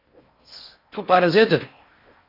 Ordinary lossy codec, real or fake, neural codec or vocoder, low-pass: Opus, 64 kbps; fake; codec, 16 kHz in and 24 kHz out, 0.6 kbps, FocalCodec, streaming, 4096 codes; 5.4 kHz